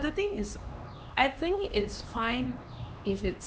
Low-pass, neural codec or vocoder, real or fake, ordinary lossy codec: none; codec, 16 kHz, 2 kbps, X-Codec, HuBERT features, trained on LibriSpeech; fake; none